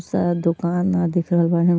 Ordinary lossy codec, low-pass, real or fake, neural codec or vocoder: none; none; real; none